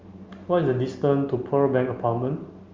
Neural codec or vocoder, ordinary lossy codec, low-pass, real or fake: none; Opus, 32 kbps; 7.2 kHz; real